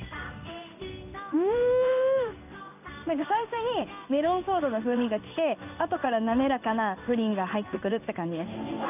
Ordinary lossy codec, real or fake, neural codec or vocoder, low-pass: none; fake; codec, 16 kHz in and 24 kHz out, 1 kbps, XY-Tokenizer; 3.6 kHz